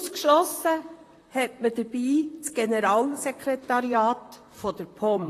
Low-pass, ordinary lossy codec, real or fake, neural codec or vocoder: 14.4 kHz; AAC, 48 kbps; fake; vocoder, 44.1 kHz, 128 mel bands, Pupu-Vocoder